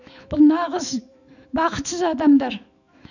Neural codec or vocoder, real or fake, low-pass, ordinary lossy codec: autoencoder, 48 kHz, 128 numbers a frame, DAC-VAE, trained on Japanese speech; fake; 7.2 kHz; none